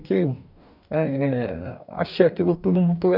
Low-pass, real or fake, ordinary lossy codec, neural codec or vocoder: 5.4 kHz; fake; none; codec, 44.1 kHz, 2.6 kbps, DAC